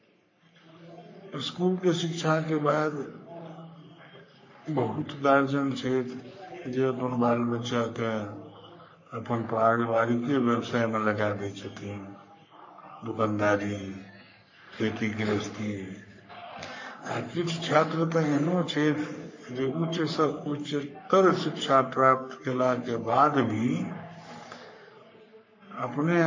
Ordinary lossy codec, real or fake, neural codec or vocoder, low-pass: MP3, 32 kbps; fake; codec, 44.1 kHz, 3.4 kbps, Pupu-Codec; 7.2 kHz